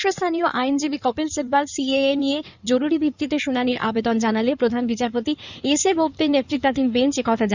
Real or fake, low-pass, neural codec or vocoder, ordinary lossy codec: fake; 7.2 kHz; codec, 16 kHz in and 24 kHz out, 2.2 kbps, FireRedTTS-2 codec; none